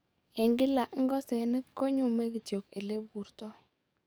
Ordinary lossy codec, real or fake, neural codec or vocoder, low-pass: none; fake; codec, 44.1 kHz, 7.8 kbps, DAC; none